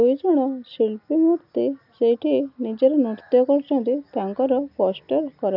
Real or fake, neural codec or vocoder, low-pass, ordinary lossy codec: real; none; 5.4 kHz; none